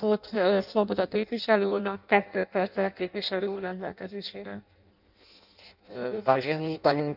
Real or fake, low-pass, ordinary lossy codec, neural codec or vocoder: fake; 5.4 kHz; none; codec, 16 kHz in and 24 kHz out, 0.6 kbps, FireRedTTS-2 codec